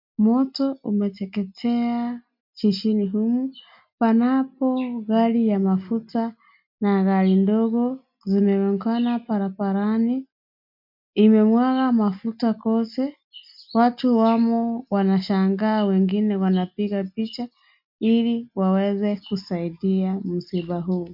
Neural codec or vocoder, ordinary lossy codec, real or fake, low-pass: none; MP3, 48 kbps; real; 5.4 kHz